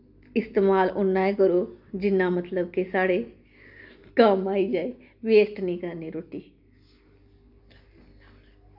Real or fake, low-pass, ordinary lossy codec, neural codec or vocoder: real; 5.4 kHz; none; none